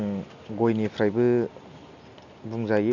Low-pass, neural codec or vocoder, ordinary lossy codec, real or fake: 7.2 kHz; none; none; real